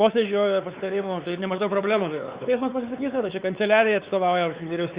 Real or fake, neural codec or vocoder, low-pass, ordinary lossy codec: fake; codec, 16 kHz, 4 kbps, X-Codec, WavLM features, trained on Multilingual LibriSpeech; 3.6 kHz; Opus, 64 kbps